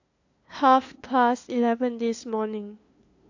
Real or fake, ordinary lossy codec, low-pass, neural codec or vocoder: fake; AAC, 48 kbps; 7.2 kHz; codec, 16 kHz, 2 kbps, FunCodec, trained on LibriTTS, 25 frames a second